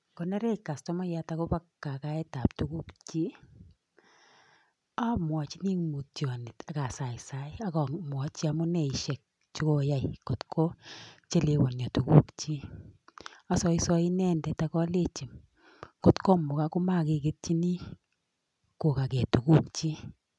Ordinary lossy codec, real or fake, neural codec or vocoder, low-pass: none; real; none; 10.8 kHz